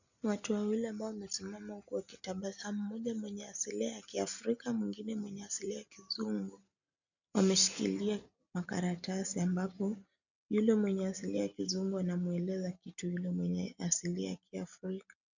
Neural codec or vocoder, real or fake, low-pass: none; real; 7.2 kHz